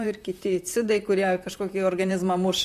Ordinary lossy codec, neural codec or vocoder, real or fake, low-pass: MP3, 64 kbps; vocoder, 44.1 kHz, 128 mel bands, Pupu-Vocoder; fake; 14.4 kHz